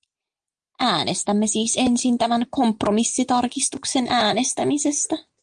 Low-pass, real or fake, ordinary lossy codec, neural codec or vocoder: 9.9 kHz; real; Opus, 32 kbps; none